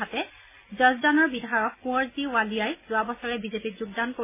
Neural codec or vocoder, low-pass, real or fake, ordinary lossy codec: none; 3.6 kHz; real; MP3, 16 kbps